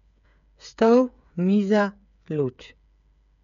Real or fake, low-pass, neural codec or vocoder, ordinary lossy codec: fake; 7.2 kHz; codec, 16 kHz, 8 kbps, FreqCodec, smaller model; none